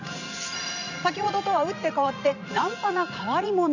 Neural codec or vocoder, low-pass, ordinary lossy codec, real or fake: vocoder, 44.1 kHz, 80 mel bands, Vocos; 7.2 kHz; none; fake